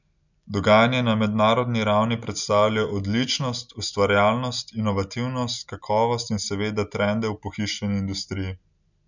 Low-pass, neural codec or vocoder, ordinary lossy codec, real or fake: 7.2 kHz; none; none; real